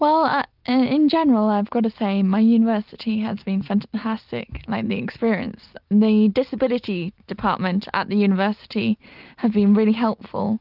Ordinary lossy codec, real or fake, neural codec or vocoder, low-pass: Opus, 24 kbps; real; none; 5.4 kHz